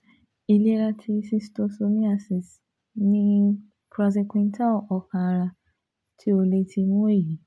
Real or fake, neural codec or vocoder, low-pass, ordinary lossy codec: real; none; none; none